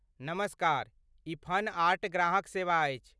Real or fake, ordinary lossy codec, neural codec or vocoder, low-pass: real; none; none; none